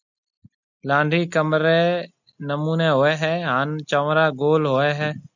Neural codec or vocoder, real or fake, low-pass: none; real; 7.2 kHz